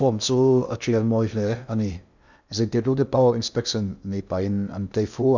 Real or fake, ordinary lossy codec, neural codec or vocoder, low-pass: fake; none; codec, 16 kHz in and 24 kHz out, 0.6 kbps, FocalCodec, streaming, 2048 codes; 7.2 kHz